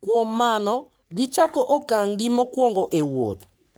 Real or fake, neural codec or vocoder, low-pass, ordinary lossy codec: fake; codec, 44.1 kHz, 3.4 kbps, Pupu-Codec; none; none